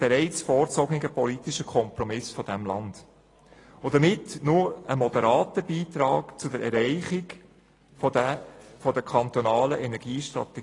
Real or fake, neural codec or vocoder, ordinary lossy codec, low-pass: real; none; AAC, 32 kbps; 10.8 kHz